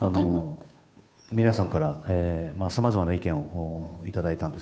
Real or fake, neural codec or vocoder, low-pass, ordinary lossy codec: fake; codec, 16 kHz, 2 kbps, FunCodec, trained on Chinese and English, 25 frames a second; none; none